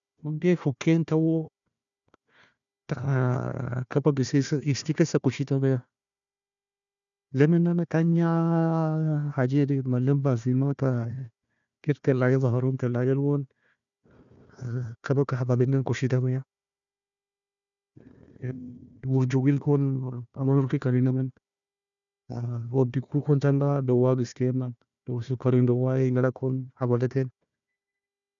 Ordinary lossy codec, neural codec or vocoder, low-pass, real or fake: none; codec, 16 kHz, 1 kbps, FunCodec, trained on Chinese and English, 50 frames a second; 7.2 kHz; fake